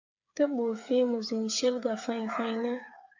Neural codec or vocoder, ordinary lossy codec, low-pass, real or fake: codec, 16 kHz, 8 kbps, FreqCodec, smaller model; AAC, 48 kbps; 7.2 kHz; fake